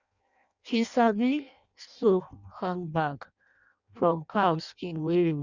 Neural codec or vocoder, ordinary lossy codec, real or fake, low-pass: codec, 16 kHz in and 24 kHz out, 0.6 kbps, FireRedTTS-2 codec; Opus, 64 kbps; fake; 7.2 kHz